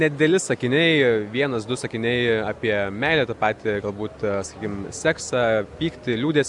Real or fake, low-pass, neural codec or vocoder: real; 10.8 kHz; none